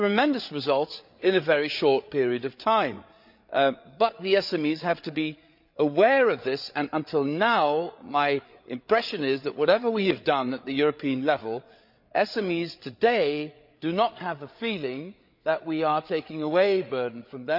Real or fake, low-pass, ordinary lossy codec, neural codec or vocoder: fake; 5.4 kHz; none; codec, 16 kHz, 8 kbps, FreqCodec, larger model